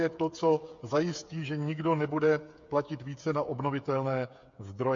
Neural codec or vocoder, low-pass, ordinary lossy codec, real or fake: codec, 16 kHz, 8 kbps, FreqCodec, smaller model; 7.2 kHz; MP3, 48 kbps; fake